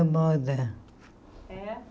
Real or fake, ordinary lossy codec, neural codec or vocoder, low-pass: real; none; none; none